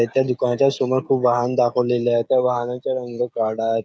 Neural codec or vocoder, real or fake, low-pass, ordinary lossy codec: codec, 16 kHz, 16 kbps, FreqCodec, smaller model; fake; none; none